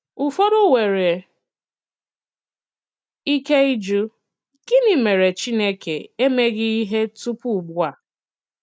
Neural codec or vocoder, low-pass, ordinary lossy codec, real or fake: none; none; none; real